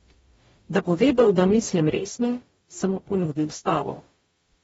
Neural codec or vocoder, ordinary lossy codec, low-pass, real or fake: codec, 44.1 kHz, 0.9 kbps, DAC; AAC, 24 kbps; 19.8 kHz; fake